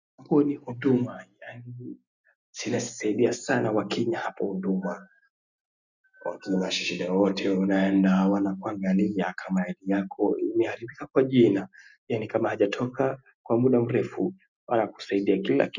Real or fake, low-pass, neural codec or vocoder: real; 7.2 kHz; none